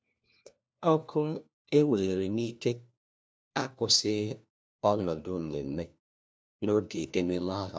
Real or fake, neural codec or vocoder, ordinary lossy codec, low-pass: fake; codec, 16 kHz, 1 kbps, FunCodec, trained on LibriTTS, 50 frames a second; none; none